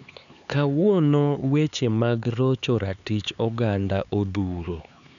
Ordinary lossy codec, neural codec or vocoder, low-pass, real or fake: none; codec, 16 kHz, 4 kbps, X-Codec, HuBERT features, trained on LibriSpeech; 7.2 kHz; fake